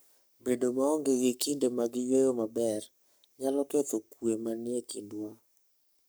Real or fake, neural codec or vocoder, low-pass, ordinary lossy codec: fake; codec, 44.1 kHz, 7.8 kbps, Pupu-Codec; none; none